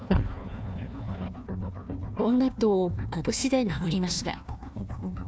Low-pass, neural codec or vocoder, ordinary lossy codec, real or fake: none; codec, 16 kHz, 1 kbps, FunCodec, trained on Chinese and English, 50 frames a second; none; fake